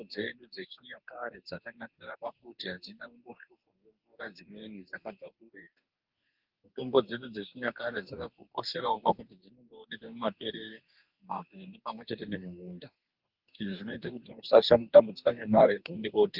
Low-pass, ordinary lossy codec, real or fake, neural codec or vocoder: 5.4 kHz; Opus, 32 kbps; fake; codec, 44.1 kHz, 2.6 kbps, DAC